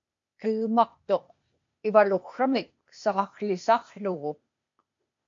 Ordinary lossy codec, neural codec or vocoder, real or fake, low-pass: MP3, 48 kbps; codec, 16 kHz, 0.8 kbps, ZipCodec; fake; 7.2 kHz